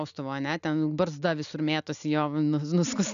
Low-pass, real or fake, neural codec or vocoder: 7.2 kHz; real; none